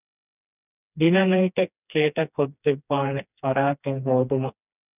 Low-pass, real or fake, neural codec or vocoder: 3.6 kHz; fake; codec, 16 kHz, 2 kbps, FreqCodec, smaller model